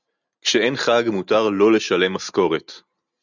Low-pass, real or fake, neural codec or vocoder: 7.2 kHz; real; none